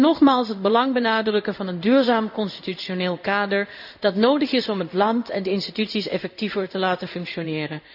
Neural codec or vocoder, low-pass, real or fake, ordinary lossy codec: codec, 16 kHz in and 24 kHz out, 1 kbps, XY-Tokenizer; 5.4 kHz; fake; none